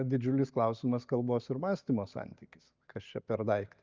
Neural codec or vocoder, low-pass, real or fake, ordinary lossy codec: none; 7.2 kHz; real; Opus, 24 kbps